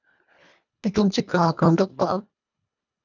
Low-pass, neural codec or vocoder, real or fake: 7.2 kHz; codec, 24 kHz, 1.5 kbps, HILCodec; fake